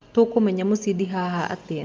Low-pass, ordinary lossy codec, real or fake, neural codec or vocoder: 7.2 kHz; Opus, 32 kbps; real; none